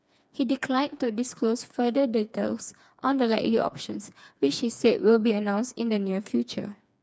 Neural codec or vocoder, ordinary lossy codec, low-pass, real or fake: codec, 16 kHz, 4 kbps, FreqCodec, smaller model; none; none; fake